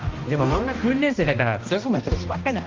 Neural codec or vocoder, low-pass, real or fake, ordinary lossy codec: codec, 16 kHz, 1 kbps, X-Codec, HuBERT features, trained on balanced general audio; 7.2 kHz; fake; Opus, 32 kbps